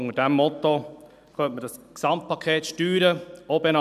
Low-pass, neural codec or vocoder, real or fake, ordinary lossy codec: 14.4 kHz; none; real; none